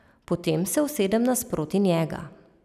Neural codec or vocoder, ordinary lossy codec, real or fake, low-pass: none; none; real; 14.4 kHz